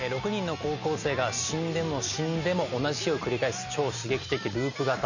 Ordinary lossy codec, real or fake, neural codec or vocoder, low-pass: none; real; none; 7.2 kHz